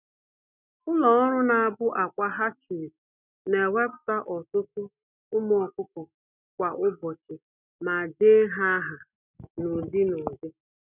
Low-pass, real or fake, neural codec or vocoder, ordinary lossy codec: 3.6 kHz; real; none; none